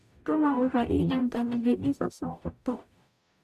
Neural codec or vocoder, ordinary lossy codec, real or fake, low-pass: codec, 44.1 kHz, 0.9 kbps, DAC; none; fake; 14.4 kHz